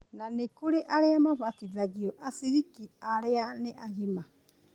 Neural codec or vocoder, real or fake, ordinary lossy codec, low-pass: none; real; Opus, 24 kbps; 19.8 kHz